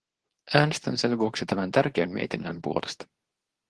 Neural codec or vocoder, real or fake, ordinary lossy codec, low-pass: codec, 24 kHz, 0.9 kbps, WavTokenizer, medium speech release version 2; fake; Opus, 16 kbps; 10.8 kHz